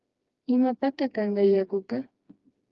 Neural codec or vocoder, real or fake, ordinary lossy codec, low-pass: codec, 16 kHz, 2 kbps, FreqCodec, smaller model; fake; Opus, 32 kbps; 7.2 kHz